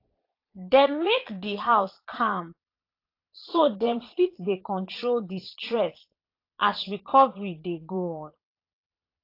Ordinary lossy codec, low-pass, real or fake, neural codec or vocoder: AAC, 32 kbps; 5.4 kHz; fake; vocoder, 22.05 kHz, 80 mel bands, Vocos